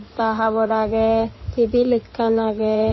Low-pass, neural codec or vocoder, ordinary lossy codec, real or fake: 7.2 kHz; codec, 16 kHz in and 24 kHz out, 2.2 kbps, FireRedTTS-2 codec; MP3, 24 kbps; fake